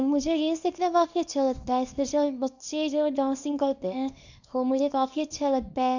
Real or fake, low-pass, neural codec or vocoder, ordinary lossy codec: fake; 7.2 kHz; codec, 24 kHz, 0.9 kbps, WavTokenizer, small release; none